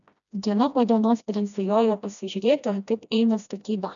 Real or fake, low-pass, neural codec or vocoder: fake; 7.2 kHz; codec, 16 kHz, 1 kbps, FreqCodec, smaller model